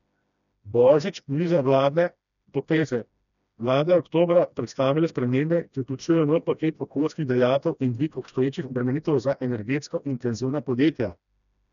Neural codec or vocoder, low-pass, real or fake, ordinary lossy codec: codec, 16 kHz, 1 kbps, FreqCodec, smaller model; 7.2 kHz; fake; none